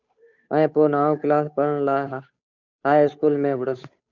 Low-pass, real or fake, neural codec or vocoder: 7.2 kHz; fake; codec, 16 kHz, 8 kbps, FunCodec, trained on Chinese and English, 25 frames a second